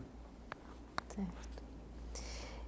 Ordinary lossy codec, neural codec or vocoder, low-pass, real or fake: none; none; none; real